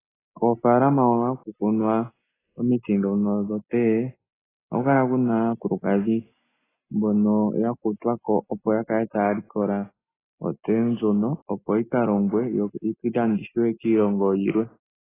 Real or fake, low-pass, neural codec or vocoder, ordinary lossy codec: real; 3.6 kHz; none; AAC, 16 kbps